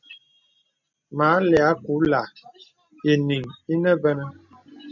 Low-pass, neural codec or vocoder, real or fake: 7.2 kHz; none; real